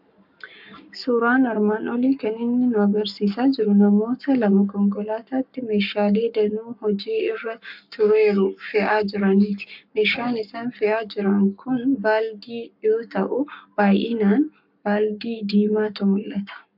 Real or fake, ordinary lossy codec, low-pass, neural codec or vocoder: fake; MP3, 48 kbps; 5.4 kHz; codec, 44.1 kHz, 7.8 kbps, Pupu-Codec